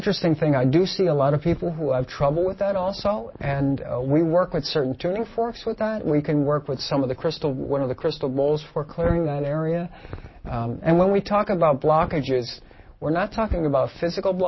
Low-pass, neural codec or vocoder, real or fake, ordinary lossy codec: 7.2 kHz; none; real; MP3, 24 kbps